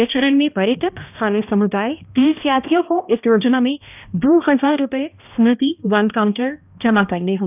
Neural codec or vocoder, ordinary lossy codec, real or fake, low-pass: codec, 16 kHz, 1 kbps, X-Codec, HuBERT features, trained on balanced general audio; none; fake; 3.6 kHz